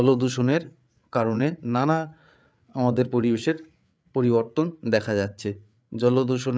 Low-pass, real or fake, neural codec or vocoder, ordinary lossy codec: none; fake; codec, 16 kHz, 8 kbps, FreqCodec, larger model; none